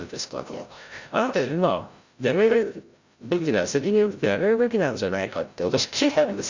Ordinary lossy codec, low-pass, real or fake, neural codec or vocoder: Opus, 64 kbps; 7.2 kHz; fake; codec, 16 kHz, 0.5 kbps, FreqCodec, larger model